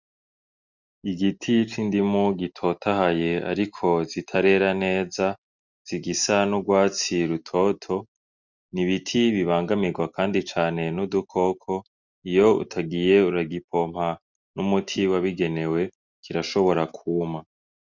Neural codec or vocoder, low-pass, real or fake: none; 7.2 kHz; real